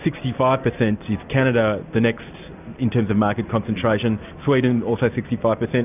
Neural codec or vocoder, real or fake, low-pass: none; real; 3.6 kHz